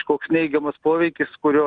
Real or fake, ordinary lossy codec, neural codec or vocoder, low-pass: real; Opus, 32 kbps; none; 9.9 kHz